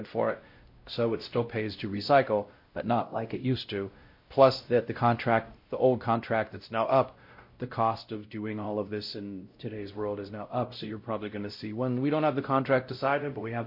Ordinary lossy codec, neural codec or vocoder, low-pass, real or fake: MP3, 32 kbps; codec, 16 kHz, 0.5 kbps, X-Codec, WavLM features, trained on Multilingual LibriSpeech; 5.4 kHz; fake